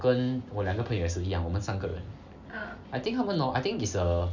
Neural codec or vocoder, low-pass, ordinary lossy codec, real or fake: none; 7.2 kHz; none; real